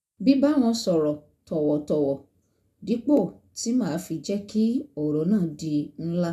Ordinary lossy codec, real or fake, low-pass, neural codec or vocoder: none; real; 14.4 kHz; none